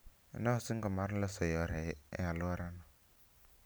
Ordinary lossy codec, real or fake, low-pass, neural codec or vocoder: none; real; none; none